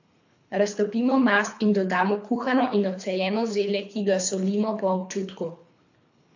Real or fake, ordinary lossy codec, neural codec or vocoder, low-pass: fake; AAC, 48 kbps; codec, 24 kHz, 3 kbps, HILCodec; 7.2 kHz